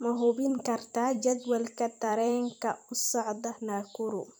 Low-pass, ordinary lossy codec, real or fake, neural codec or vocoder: none; none; fake; vocoder, 44.1 kHz, 128 mel bands every 256 samples, BigVGAN v2